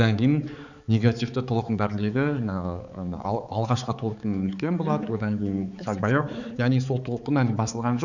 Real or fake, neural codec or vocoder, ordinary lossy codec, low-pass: fake; codec, 16 kHz, 4 kbps, X-Codec, HuBERT features, trained on balanced general audio; none; 7.2 kHz